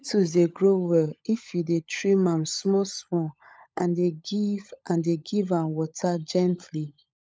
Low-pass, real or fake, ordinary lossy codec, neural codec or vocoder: none; fake; none; codec, 16 kHz, 16 kbps, FunCodec, trained on LibriTTS, 50 frames a second